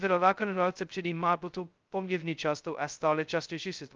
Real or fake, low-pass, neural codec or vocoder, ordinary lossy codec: fake; 7.2 kHz; codec, 16 kHz, 0.2 kbps, FocalCodec; Opus, 24 kbps